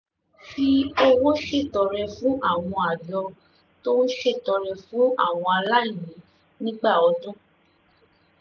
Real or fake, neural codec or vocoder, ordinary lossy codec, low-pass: real; none; none; none